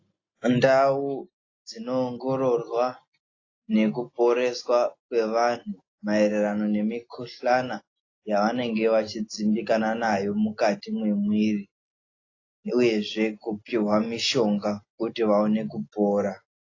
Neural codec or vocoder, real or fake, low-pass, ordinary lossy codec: none; real; 7.2 kHz; AAC, 32 kbps